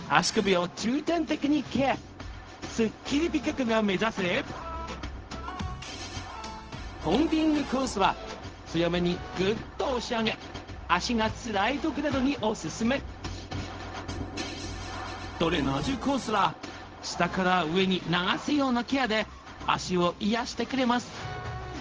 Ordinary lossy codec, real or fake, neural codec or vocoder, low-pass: Opus, 16 kbps; fake; codec, 16 kHz, 0.4 kbps, LongCat-Audio-Codec; 7.2 kHz